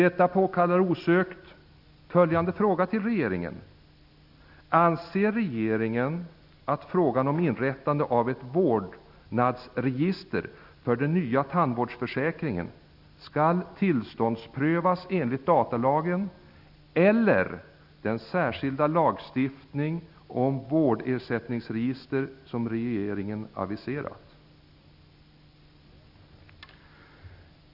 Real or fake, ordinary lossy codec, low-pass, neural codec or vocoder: real; none; 5.4 kHz; none